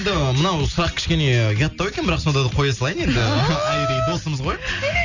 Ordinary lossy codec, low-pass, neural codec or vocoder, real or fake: none; 7.2 kHz; none; real